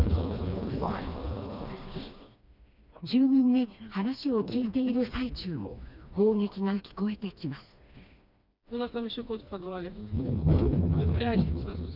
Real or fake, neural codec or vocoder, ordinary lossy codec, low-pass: fake; codec, 16 kHz, 2 kbps, FreqCodec, smaller model; none; 5.4 kHz